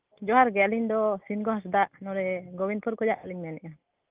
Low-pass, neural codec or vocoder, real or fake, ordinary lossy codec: 3.6 kHz; none; real; Opus, 24 kbps